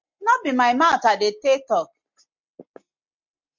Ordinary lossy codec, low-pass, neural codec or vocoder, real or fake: MP3, 64 kbps; 7.2 kHz; none; real